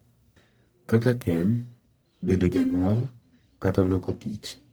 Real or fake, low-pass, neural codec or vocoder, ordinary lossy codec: fake; none; codec, 44.1 kHz, 1.7 kbps, Pupu-Codec; none